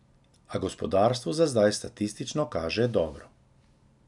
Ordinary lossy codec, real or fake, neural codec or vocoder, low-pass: none; real; none; 10.8 kHz